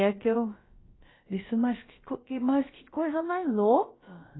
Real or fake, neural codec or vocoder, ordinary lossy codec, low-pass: fake; codec, 16 kHz, about 1 kbps, DyCAST, with the encoder's durations; AAC, 16 kbps; 7.2 kHz